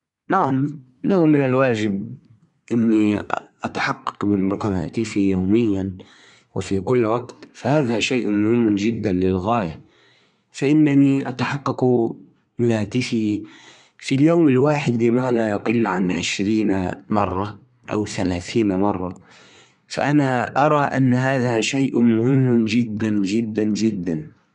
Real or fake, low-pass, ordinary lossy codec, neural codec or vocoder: fake; 10.8 kHz; none; codec, 24 kHz, 1 kbps, SNAC